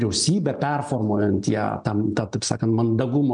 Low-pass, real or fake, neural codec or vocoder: 9.9 kHz; real; none